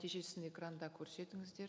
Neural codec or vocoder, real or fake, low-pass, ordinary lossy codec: none; real; none; none